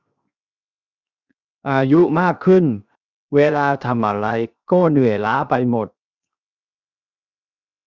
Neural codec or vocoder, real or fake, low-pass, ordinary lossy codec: codec, 16 kHz, 0.7 kbps, FocalCodec; fake; 7.2 kHz; Opus, 64 kbps